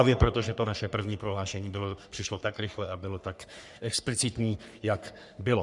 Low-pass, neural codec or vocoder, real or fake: 10.8 kHz; codec, 44.1 kHz, 3.4 kbps, Pupu-Codec; fake